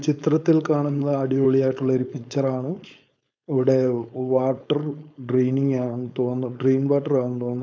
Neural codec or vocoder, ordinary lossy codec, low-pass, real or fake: codec, 16 kHz, 4.8 kbps, FACodec; none; none; fake